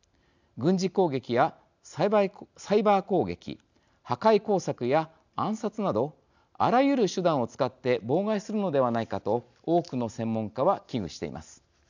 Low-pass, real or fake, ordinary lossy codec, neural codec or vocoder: 7.2 kHz; real; none; none